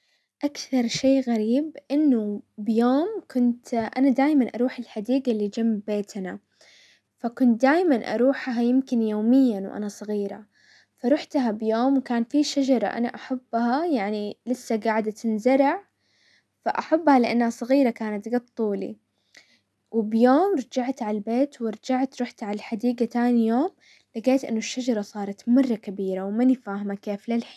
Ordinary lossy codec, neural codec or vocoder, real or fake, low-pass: none; none; real; none